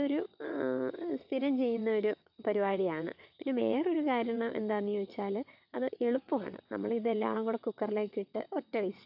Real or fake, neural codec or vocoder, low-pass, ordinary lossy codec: fake; vocoder, 22.05 kHz, 80 mel bands, WaveNeXt; 5.4 kHz; AAC, 48 kbps